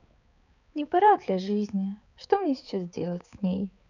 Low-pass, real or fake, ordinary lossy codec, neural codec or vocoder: 7.2 kHz; fake; none; codec, 16 kHz, 4 kbps, X-Codec, HuBERT features, trained on balanced general audio